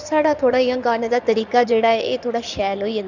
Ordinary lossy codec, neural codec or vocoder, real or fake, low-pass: none; codec, 16 kHz in and 24 kHz out, 2.2 kbps, FireRedTTS-2 codec; fake; 7.2 kHz